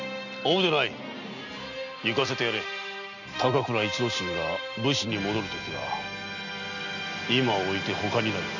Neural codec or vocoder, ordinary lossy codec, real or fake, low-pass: none; none; real; 7.2 kHz